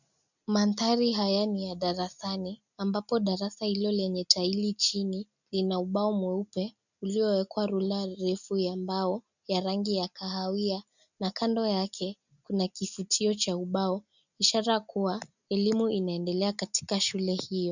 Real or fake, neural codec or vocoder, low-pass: real; none; 7.2 kHz